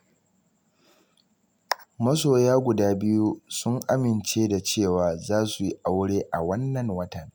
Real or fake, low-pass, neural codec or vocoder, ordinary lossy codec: real; none; none; none